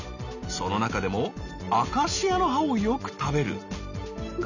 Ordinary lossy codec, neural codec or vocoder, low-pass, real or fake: none; none; 7.2 kHz; real